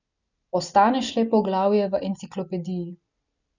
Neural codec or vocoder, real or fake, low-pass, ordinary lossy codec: none; real; 7.2 kHz; none